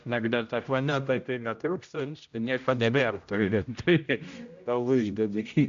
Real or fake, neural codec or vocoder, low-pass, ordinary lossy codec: fake; codec, 16 kHz, 0.5 kbps, X-Codec, HuBERT features, trained on general audio; 7.2 kHz; MP3, 64 kbps